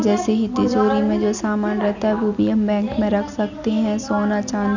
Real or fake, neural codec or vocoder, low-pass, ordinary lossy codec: real; none; 7.2 kHz; none